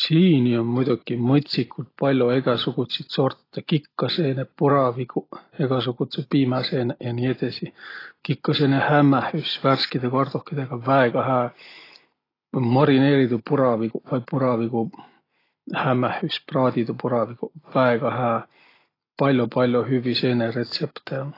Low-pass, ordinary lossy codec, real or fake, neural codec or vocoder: 5.4 kHz; AAC, 24 kbps; real; none